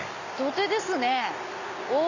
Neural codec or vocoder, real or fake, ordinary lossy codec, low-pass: none; real; none; 7.2 kHz